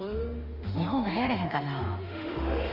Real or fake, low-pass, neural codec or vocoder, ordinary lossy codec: fake; 5.4 kHz; autoencoder, 48 kHz, 32 numbers a frame, DAC-VAE, trained on Japanese speech; Opus, 32 kbps